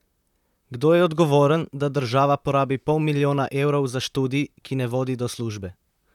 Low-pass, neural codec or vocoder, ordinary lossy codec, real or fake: 19.8 kHz; vocoder, 44.1 kHz, 128 mel bands, Pupu-Vocoder; none; fake